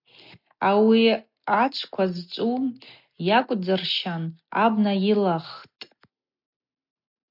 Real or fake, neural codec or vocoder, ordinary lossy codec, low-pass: real; none; MP3, 48 kbps; 5.4 kHz